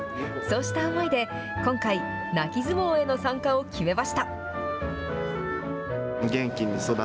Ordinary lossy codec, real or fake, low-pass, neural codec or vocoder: none; real; none; none